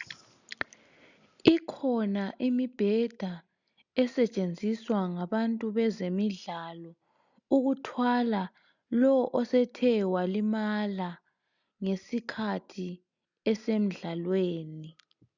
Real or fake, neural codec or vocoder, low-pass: real; none; 7.2 kHz